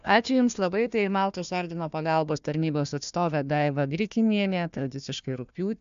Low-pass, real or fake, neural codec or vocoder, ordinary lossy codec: 7.2 kHz; fake; codec, 16 kHz, 1 kbps, FunCodec, trained on Chinese and English, 50 frames a second; MP3, 64 kbps